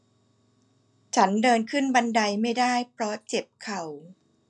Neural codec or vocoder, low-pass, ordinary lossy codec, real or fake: none; 10.8 kHz; none; real